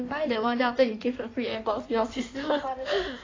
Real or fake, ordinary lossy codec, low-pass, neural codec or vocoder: fake; AAC, 32 kbps; 7.2 kHz; codec, 16 kHz in and 24 kHz out, 1.1 kbps, FireRedTTS-2 codec